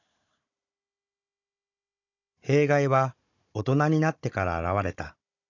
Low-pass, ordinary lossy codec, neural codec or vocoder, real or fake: 7.2 kHz; none; codec, 16 kHz, 16 kbps, FunCodec, trained on Chinese and English, 50 frames a second; fake